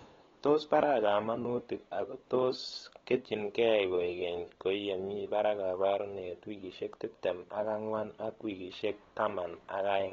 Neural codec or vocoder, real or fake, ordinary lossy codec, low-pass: codec, 16 kHz, 8 kbps, FunCodec, trained on LibriTTS, 25 frames a second; fake; AAC, 24 kbps; 7.2 kHz